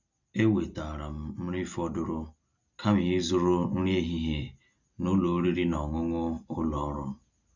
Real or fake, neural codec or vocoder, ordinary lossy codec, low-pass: real; none; none; 7.2 kHz